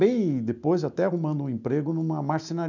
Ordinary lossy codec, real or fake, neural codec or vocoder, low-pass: none; real; none; 7.2 kHz